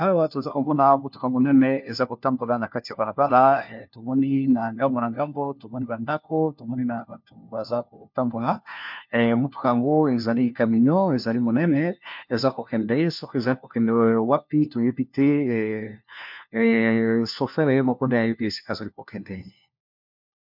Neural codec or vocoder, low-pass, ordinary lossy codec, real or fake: codec, 16 kHz, 1 kbps, FunCodec, trained on LibriTTS, 50 frames a second; 5.4 kHz; MP3, 48 kbps; fake